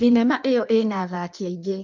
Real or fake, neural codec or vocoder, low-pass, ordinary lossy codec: fake; codec, 16 kHz in and 24 kHz out, 1.1 kbps, FireRedTTS-2 codec; 7.2 kHz; MP3, 64 kbps